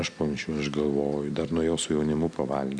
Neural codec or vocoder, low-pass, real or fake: none; 9.9 kHz; real